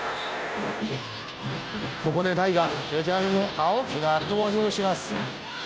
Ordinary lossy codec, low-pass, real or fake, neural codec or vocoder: none; none; fake; codec, 16 kHz, 0.5 kbps, FunCodec, trained on Chinese and English, 25 frames a second